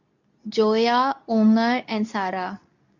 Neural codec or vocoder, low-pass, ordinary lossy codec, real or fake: codec, 24 kHz, 0.9 kbps, WavTokenizer, medium speech release version 2; 7.2 kHz; none; fake